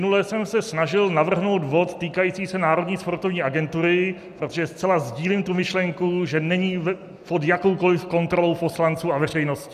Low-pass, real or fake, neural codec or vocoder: 14.4 kHz; real; none